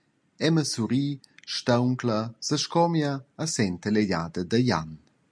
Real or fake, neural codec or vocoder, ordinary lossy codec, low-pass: real; none; MP3, 64 kbps; 9.9 kHz